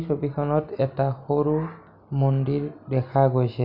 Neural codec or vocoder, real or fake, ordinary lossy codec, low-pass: none; real; none; 5.4 kHz